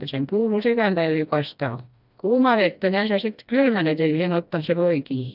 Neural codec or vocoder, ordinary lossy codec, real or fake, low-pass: codec, 16 kHz, 1 kbps, FreqCodec, smaller model; none; fake; 5.4 kHz